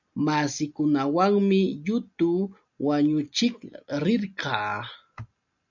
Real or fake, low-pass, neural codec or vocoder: real; 7.2 kHz; none